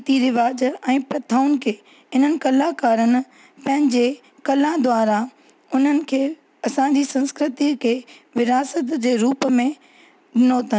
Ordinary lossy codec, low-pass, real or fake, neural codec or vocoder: none; none; real; none